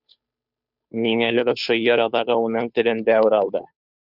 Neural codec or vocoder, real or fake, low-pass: codec, 16 kHz, 2 kbps, FunCodec, trained on Chinese and English, 25 frames a second; fake; 5.4 kHz